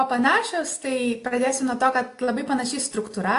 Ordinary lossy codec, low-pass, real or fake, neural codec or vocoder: AAC, 48 kbps; 10.8 kHz; real; none